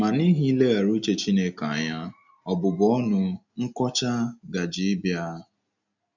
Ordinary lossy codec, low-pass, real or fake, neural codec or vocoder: none; 7.2 kHz; real; none